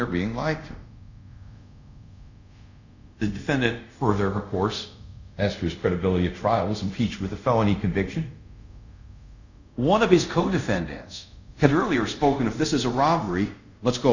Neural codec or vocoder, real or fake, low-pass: codec, 24 kHz, 0.5 kbps, DualCodec; fake; 7.2 kHz